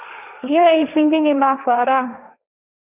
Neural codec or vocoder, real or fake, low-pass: codec, 16 kHz, 1.1 kbps, Voila-Tokenizer; fake; 3.6 kHz